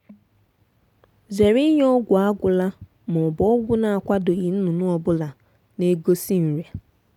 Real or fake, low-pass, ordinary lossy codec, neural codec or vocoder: real; 19.8 kHz; none; none